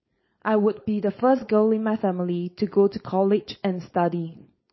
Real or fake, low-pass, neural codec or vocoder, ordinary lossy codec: fake; 7.2 kHz; codec, 16 kHz, 4.8 kbps, FACodec; MP3, 24 kbps